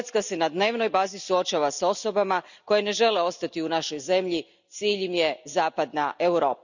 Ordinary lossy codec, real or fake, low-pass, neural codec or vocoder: none; real; 7.2 kHz; none